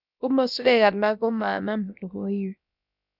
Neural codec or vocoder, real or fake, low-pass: codec, 16 kHz, about 1 kbps, DyCAST, with the encoder's durations; fake; 5.4 kHz